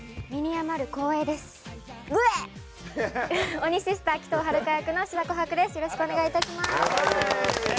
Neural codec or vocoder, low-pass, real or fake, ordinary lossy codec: none; none; real; none